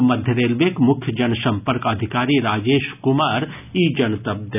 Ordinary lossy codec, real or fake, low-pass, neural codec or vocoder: none; real; 3.6 kHz; none